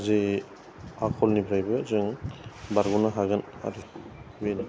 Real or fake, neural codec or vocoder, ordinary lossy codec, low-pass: real; none; none; none